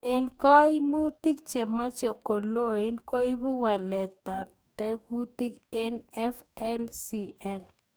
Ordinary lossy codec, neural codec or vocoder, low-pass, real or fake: none; codec, 44.1 kHz, 2.6 kbps, DAC; none; fake